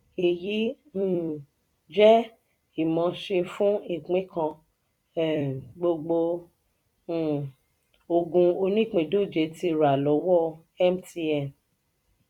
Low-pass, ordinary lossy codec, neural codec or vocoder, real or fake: 19.8 kHz; none; vocoder, 44.1 kHz, 128 mel bands every 256 samples, BigVGAN v2; fake